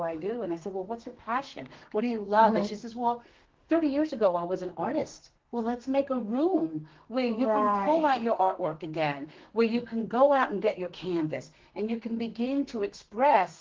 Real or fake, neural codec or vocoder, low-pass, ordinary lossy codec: fake; codec, 32 kHz, 1.9 kbps, SNAC; 7.2 kHz; Opus, 16 kbps